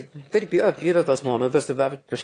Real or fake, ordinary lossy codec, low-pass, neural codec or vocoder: fake; AAC, 48 kbps; 9.9 kHz; autoencoder, 22.05 kHz, a latent of 192 numbers a frame, VITS, trained on one speaker